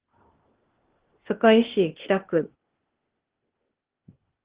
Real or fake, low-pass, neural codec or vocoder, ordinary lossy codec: fake; 3.6 kHz; codec, 16 kHz, 0.8 kbps, ZipCodec; Opus, 16 kbps